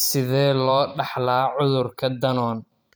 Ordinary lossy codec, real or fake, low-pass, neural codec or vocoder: none; fake; none; vocoder, 44.1 kHz, 128 mel bands every 512 samples, BigVGAN v2